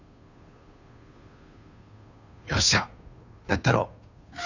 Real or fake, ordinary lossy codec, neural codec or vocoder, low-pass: fake; none; codec, 16 kHz, 2 kbps, FunCodec, trained on Chinese and English, 25 frames a second; 7.2 kHz